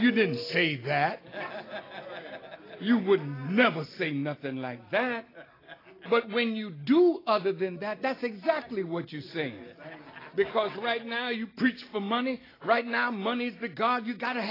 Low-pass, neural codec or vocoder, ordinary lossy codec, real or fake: 5.4 kHz; none; AAC, 24 kbps; real